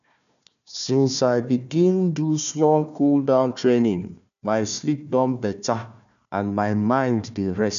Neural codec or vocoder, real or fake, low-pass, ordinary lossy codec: codec, 16 kHz, 1 kbps, FunCodec, trained on Chinese and English, 50 frames a second; fake; 7.2 kHz; none